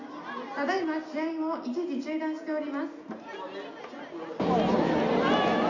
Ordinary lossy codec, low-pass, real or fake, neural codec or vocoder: none; 7.2 kHz; real; none